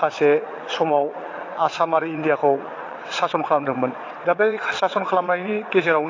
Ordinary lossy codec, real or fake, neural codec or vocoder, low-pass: AAC, 32 kbps; fake; vocoder, 22.05 kHz, 80 mel bands, WaveNeXt; 7.2 kHz